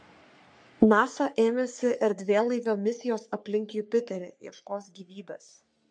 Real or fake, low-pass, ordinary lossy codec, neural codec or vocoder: fake; 9.9 kHz; MP3, 64 kbps; codec, 44.1 kHz, 3.4 kbps, Pupu-Codec